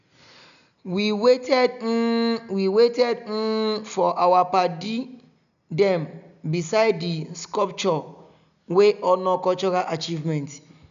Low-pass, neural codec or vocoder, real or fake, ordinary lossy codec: 7.2 kHz; none; real; none